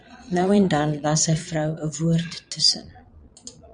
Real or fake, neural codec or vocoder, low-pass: fake; vocoder, 22.05 kHz, 80 mel bands, Vocos; 9.9 kHz